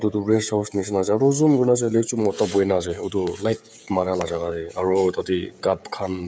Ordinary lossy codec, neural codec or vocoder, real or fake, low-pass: none; codec, 16 kHz, 16 kbps, FreqCodec, smaller model; fake; none